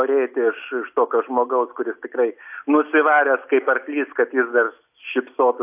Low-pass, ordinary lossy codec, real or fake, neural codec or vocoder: 3.6 kHz; AAC, 32 kbps; real; none